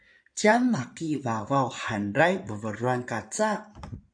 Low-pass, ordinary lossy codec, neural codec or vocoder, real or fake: 9.9 kHz; AAC, 64 kbps; codec, 16 kHz in and 24 kHz out, 2.2 kbps, FireRedTTS-2 codec; fake